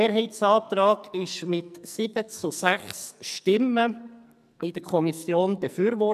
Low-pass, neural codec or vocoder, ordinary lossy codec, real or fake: 14.4 kHz; codec, 44.1 kHz, 2.6 kbps, SNAC; none; fake